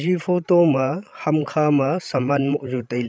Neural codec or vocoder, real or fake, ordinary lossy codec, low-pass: codec, 16 kHz, 16 kbps, FreqCodec, larger model; fake; none; none